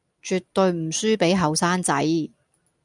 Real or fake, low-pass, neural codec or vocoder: real; 10.8 kHz; none